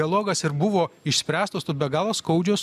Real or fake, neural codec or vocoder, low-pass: real; none; 14.4 kHz